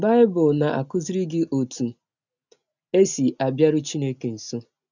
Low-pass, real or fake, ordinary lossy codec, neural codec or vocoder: 7.2 kHz; real; none; none